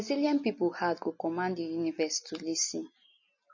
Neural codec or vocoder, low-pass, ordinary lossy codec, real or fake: none; 7.2 kHz; MP3, 32 kbps; real